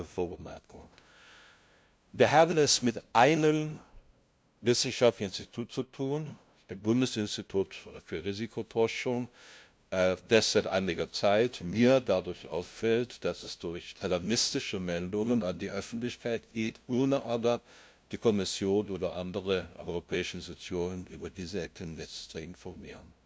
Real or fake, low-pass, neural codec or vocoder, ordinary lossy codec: fake; none; codec, 16 kHz, 0.5 kbps, FunCodec, trained on LibriTTS, 25 frames a second; none